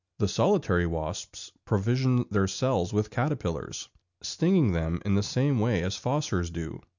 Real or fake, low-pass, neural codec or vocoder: real; 7.2 kHz; none